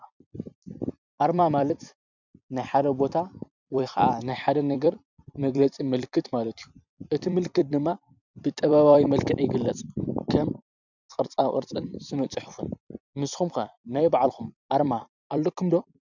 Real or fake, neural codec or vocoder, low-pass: fake; vocoder, 44.1 kHz, 128 mel bands every 256 samples, BigVGAN v2; 7.2 kHz